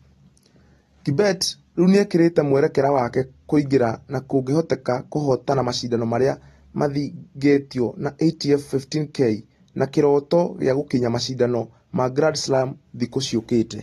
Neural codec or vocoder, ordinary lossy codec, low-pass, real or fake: none; AAC, 32 kbps; 19.8 kHz; real